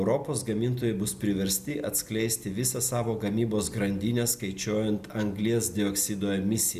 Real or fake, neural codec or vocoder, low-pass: real; none; 14.4 kHz